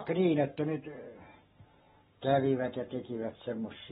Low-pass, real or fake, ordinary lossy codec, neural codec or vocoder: 19.8 kHz; fake; AAC, 16 kbps; codec, 44.1 kHz, 7.8 kbps, Pupu-Codec